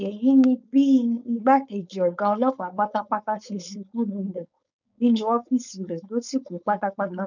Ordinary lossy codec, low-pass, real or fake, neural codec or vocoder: none; 7.2 kHz; fake; codec, 16 kHz, 4.8 kbps, FACodec